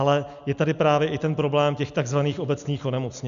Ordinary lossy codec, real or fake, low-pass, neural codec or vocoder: MP3, 96 kbps; real; 7.2 kHz; none